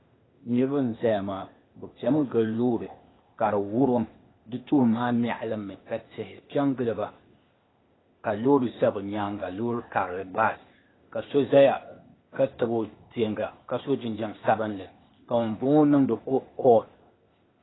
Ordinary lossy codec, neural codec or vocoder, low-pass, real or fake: AAC, 16 kbps; codec, 16 kHz, 0.8 kbps, ZipCodec; 7.2 kHz; fake